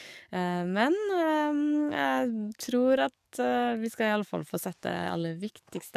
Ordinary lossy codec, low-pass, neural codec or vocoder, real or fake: none; 14.4 kHz; codec, 44.1 kHz, 7.8 kbps, DAC; fake